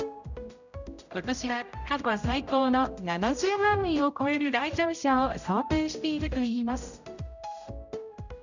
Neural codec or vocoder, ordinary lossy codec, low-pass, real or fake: codec, 16 kHz, 0.5 kbps, X-Codec, HuBERT features, trained on general audio; none; 7.2 kHz; fake